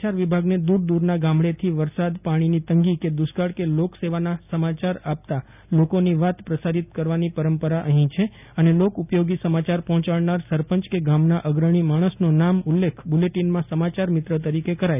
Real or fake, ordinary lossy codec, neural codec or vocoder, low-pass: real; none; none; 3.6 kHz